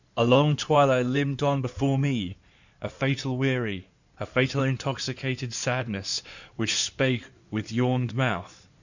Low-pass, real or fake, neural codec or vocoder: 7.2 kHz; fake; codec, 16 kHz in and 24 kHz out, 2.2 kbps, FireRedTTS-2 codec